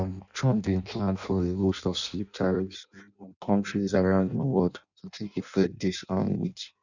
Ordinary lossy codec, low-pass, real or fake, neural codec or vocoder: none; 7.2 kHz; fake; codec, 16 kHz in and 24 kHz out, 0.6 kbps, FireRedTTS-2 codec